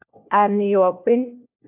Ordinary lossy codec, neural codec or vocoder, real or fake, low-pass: AAC, 24 kbps; codec, 16 kHz, 1 kbps, FunCodec, trained on LibriTTS, 50 frames a second; fake; 3.6 kHz